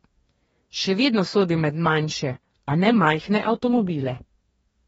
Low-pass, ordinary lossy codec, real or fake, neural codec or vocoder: 19.8 kHz; AAC, 24 kbps; fake; codec, 44.1 kHz, 2.6 kbps, DAC